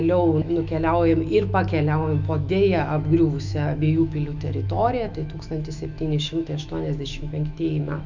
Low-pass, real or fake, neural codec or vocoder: 7.2 kHz; fake; autoencoder, 48 kHz, 128 numbers a frame, DAC-VAE, trained on Japanese speech